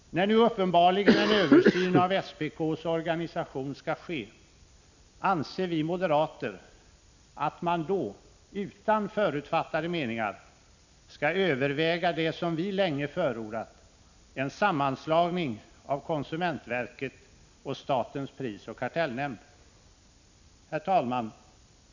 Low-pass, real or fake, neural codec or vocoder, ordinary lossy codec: 7.2 kHz; real; none; none